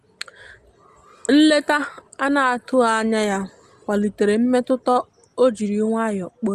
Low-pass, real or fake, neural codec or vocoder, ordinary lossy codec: 14.4 kHz; real; none; Opus, 24 kbps